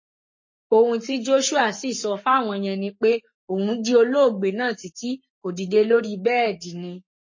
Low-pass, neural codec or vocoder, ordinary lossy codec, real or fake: 7.2 kHz; codec, 44.1 kHz, 7.8 kbps, Pupu-Codec; MP3, 32 kbps; fake